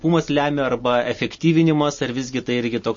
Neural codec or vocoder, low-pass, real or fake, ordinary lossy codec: none; 7.2 kHz; real; MP3, 32 kbps